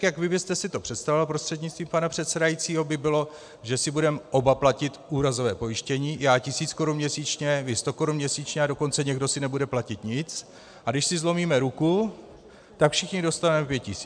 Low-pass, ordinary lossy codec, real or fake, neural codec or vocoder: 9.9 kHz; MP3, 96 kbps; real; none